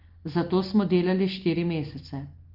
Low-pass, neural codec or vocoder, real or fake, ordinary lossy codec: 5.4 kHz; none; real; Opus, 24 kbps